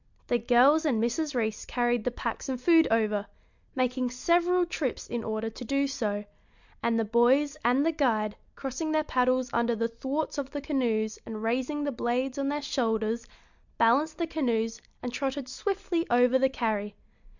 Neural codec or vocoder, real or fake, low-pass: none; real; 7.2 kHz